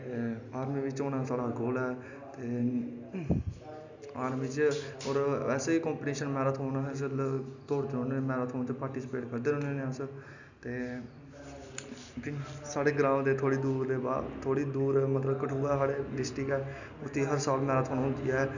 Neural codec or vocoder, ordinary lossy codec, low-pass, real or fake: none; none; 7.2 kHz; real